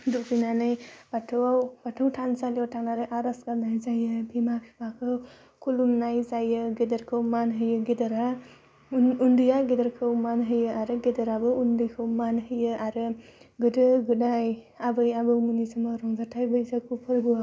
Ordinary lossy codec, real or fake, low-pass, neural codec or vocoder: none; real; none; none